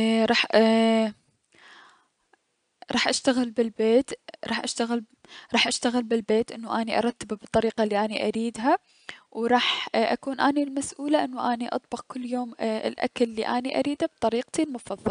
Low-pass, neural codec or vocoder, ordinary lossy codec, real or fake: 9.9 kHz; none; none; real